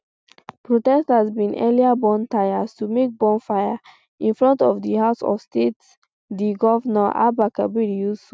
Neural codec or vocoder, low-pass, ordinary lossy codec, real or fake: none; none; none; real